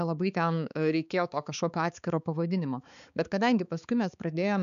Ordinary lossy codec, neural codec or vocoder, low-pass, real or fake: MP3, 96 kbps; codec, 16 kHz, 4 kbps, X-Codec, HuBERT features, trained on balanced general audio; 7.2 kHz; fake